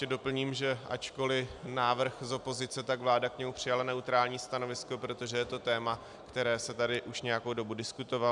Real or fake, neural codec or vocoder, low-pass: real; none; 10.8 kHz